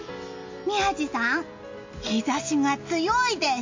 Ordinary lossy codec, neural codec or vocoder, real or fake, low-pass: MP3, 48 kbps; none; real; 7.2 kHz